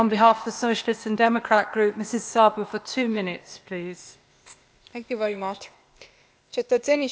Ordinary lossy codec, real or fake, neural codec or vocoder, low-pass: none; fake; codec, 16 kHz, 0.8 kbps, ZipCodec; none